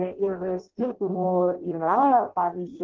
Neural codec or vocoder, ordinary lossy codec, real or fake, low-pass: codec, 16 kHz in and 24 kHz out, 0.6 kbps, FireRedTTS-2 codec; Opus, 16 kbps; fake; 7.2 kHz